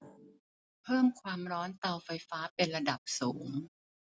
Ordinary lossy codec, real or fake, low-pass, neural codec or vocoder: none; real; none; none